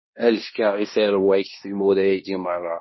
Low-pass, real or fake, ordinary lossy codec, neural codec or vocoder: 7.2 kHz; fake; MP3, 24 kbps; codec, 16 kHz in and 24 kHz out, 0.9 kbps, LongCat-Audio-Codec, fine tuned four codebook decoder